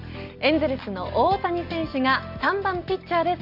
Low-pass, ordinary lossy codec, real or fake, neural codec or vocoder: 5.4 kHz; Opus, 64 kbps; real; none